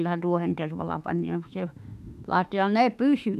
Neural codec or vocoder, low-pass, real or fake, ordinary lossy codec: autoencoder, 48 kHz, 32 numbers a frame, DAC-VAE, trained on Japanese speech; 14.4 kHz; fake; none